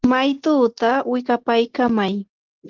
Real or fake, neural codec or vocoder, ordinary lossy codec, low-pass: real; none; Opus, 16 kbps; 7.2 kHz